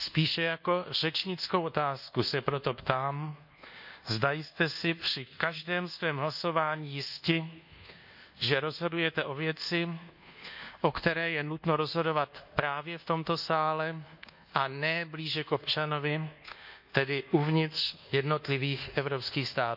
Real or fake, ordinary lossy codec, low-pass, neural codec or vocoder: fake; none; 5.4 kHz; codec, 24 kHz, 1.2 kbps, DualCodec